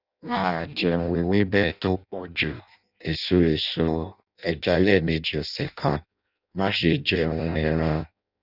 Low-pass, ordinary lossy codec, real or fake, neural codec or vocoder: 5.4 kHz; none; fake; codec, 16 kHz in and 24 kHz out, 0.6 kbps, FireRedTTS-2 codec